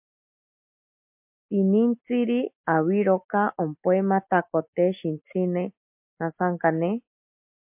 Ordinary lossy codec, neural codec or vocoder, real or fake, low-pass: MP3, 32 kbps; none; real; 3.6 kHz